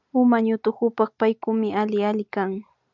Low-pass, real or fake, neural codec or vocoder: 7.2 kHz; real; none